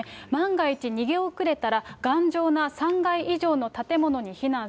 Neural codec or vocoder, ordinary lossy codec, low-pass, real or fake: none; none; none; real